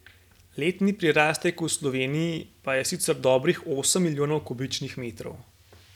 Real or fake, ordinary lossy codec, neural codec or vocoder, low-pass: real; none; none; 19.8 kHz